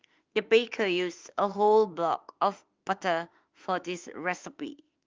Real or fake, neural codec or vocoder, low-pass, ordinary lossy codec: fake; autoencoder, 48 kHz, 32 numbers a frame, DAC-VAE, trained on Japanese speech; 7.2 kHz; Opus, 32 kbps